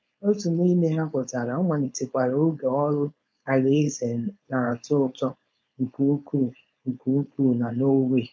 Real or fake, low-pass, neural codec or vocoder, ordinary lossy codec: fake; none; codec, 16 kHz, 4.8 kbps, FACodec; none